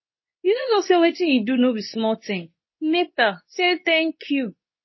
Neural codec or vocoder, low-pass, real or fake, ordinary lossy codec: codec, 24 kHz, 0.9 kbps, WavTokenizer, medium speech release version 2; 7.2 kHz; fake; MP3, 24 kbps